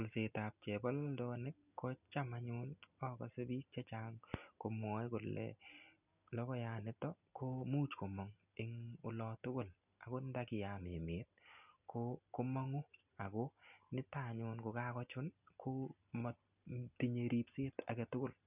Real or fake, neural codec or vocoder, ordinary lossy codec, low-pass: real; none; none; 3.6 kHz